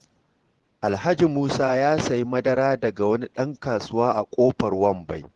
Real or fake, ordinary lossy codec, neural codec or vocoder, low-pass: fake; Opus, 16 kbps; vocoder, 24 kHz, 100 mel bands, Vocos; 10.8 kHz